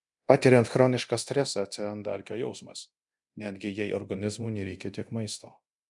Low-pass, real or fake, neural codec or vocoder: 10.8 kHz; fake; codec, 24 kHz, 0.9 kbps, DualCodec